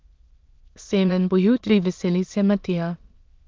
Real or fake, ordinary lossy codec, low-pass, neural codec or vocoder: fake; Opus, 32 kbps; 7.2 kHz; autoencoder, 22.05 kHz, a latent of 192 numbers a frame, VITS, trained on many speakers